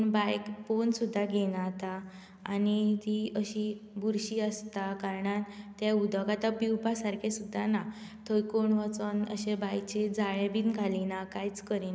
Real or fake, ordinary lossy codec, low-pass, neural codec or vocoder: real; none; none; none